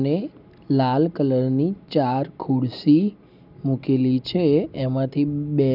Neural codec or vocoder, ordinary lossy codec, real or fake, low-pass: none; none; real; 5.4 kHz